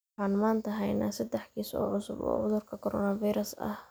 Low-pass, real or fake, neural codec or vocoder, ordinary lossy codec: none; real; none; none